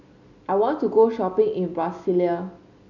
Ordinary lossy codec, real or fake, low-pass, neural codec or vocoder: none; real; 7.2 kHz; none